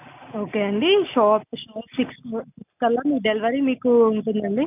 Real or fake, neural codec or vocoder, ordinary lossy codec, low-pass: real; none; none; 3.6 kHz